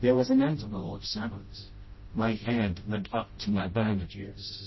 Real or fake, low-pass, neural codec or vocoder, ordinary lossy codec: fake; 7.2 kHz; codec, 16 kHz, 0.5 kbps, FreqCodec, smaller model; MP3, 24 kbps